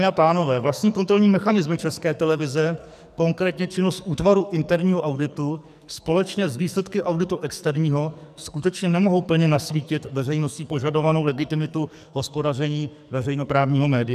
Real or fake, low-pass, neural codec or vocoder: fake; 14.4 kHz; codec, 44.1 kHz, 2.6 kbps, SNAC